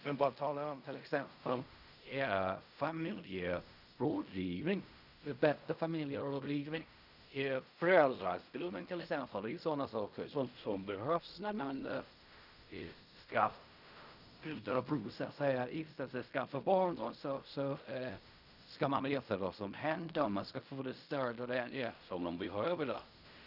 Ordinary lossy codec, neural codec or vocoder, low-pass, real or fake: none; codec, 16 kHz in and 24 kHz out, 0.4 kbps, LongCat-Audio-Codec, fine tuned four codebook decoder; 5.4 kHz; fake